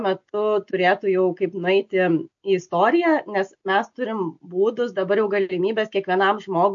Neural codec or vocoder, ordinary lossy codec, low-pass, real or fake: none; MP3, 48 kbps; 7.2 kHz; real